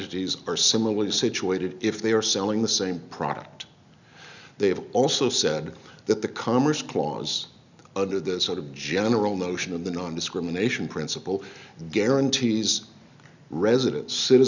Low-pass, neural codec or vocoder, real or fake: 7.2 kHz; none; real